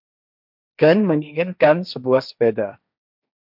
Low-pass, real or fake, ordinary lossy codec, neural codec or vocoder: 5.4 kHz; fake; MP3, 48 kbps; codec, 16 kHz, 1.1 kbps, Voila-Tokenizer